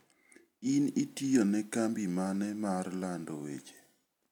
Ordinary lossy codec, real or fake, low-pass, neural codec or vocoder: none; real; 19.8 kHz; none